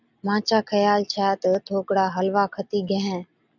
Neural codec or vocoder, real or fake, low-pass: none; real; 7.2 kHz